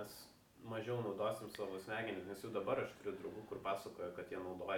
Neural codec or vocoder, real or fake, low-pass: none; real; 19.8 kHz